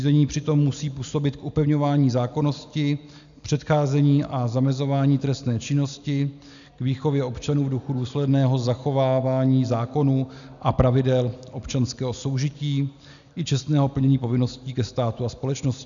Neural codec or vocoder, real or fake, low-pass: none; real; 7.2 kHz